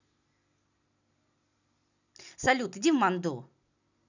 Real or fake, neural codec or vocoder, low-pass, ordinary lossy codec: real; none; 7.2 kHz; none